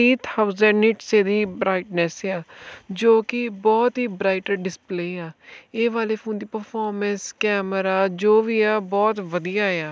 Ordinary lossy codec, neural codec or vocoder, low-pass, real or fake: none; none; none; real